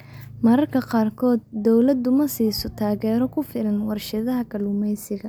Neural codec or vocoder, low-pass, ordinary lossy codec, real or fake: none; none; none; real